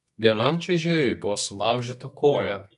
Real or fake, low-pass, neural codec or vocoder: fake; 10.8 kHz; codec, 24 kHz, 0.9 kbps, WavTokenizer, medium music audio release